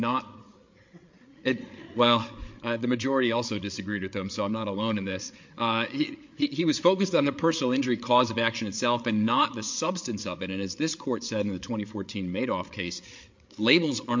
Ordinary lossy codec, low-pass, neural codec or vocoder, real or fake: MP3, 48 kbps; 7.2 kHz; codec, 16 kHz, 8 kbps, FreqCodec, larger model; fake